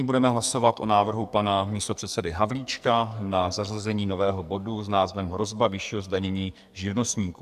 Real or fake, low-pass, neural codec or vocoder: fake; 14.4 kHz; codec, 44.1 kHz, 2.6 kbps, SNAC